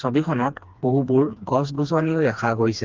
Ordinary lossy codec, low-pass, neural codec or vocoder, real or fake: Opus, 32 kbps; 7.2 kHz; codec, 16 kHz, 2 kbps, FreqCodec, smaller model; fake